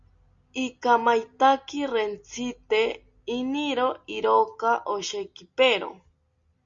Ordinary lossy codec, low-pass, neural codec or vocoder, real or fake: Opus, 64 kbps; 7.2 kHz; none; real